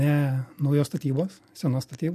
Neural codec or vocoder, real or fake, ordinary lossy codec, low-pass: none; real; MP3, 64 kbps; 14.4 kHz